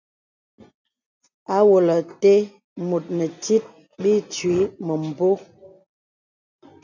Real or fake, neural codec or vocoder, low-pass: real; none; 7.2 kHz